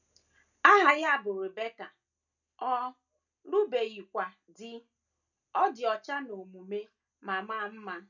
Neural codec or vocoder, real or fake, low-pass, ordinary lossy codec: none; real; 7.2 kHz; none